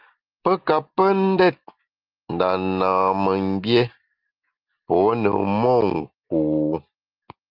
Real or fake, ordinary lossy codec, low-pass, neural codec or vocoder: real; Opus, 32 kbps; 5.4 kHz; none